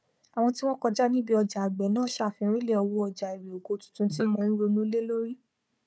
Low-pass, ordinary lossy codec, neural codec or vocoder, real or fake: none; none; codec, 16 kHz, 4 kbps, FunCodec, trained on Chinese and English, 50 frames a second; fake